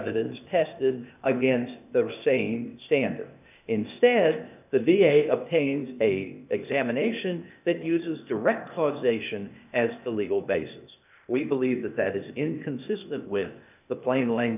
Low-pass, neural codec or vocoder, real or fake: 3.6 kHz; codec, 16 kHz, 0.8 kbps, ZipCodec; fake